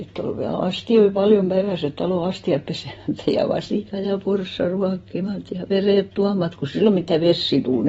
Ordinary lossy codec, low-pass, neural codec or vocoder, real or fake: AAC, 24 kbps; 19.8 kHz; vocoder, 44.1 kHz, 128 mel bands every 256 samples, BigVGAN v2; fake